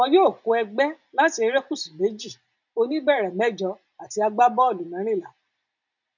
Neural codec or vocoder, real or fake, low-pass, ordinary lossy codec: none; real; 7.2 kHz; none